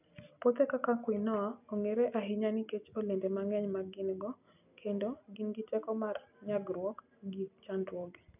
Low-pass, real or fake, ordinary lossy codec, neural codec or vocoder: 3.6 kHz; real; none; none